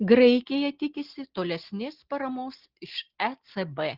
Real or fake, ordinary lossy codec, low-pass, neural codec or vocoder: real; Opus, 16 kbps; 5.4 kHz; none